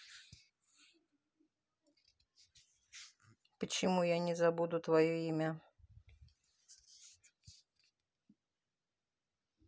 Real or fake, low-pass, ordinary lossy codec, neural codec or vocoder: real; none; none; none